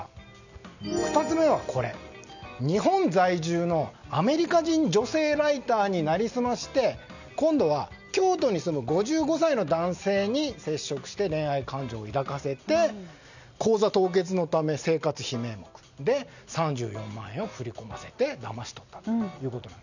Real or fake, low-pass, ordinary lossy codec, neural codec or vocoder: real; 7.2 kHz; none; none